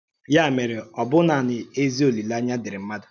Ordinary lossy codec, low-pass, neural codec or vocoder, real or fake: none; 7.2 kHz; none; real